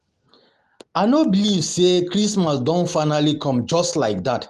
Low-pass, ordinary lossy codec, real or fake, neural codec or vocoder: 14.4 kHz; Opus, 24 kbps; real; none